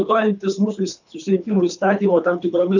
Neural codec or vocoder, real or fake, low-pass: codec, 24 kHz, 3 kbps, HILCodec; fake; 7.2 kHz